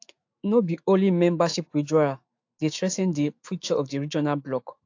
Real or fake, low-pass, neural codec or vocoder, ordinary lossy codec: fake; 7.2 kHz; autoencoder, 48 kHz, 128 numbers a frame, DAC-VAE, trained on Japanese speech; AAC, 48 kbps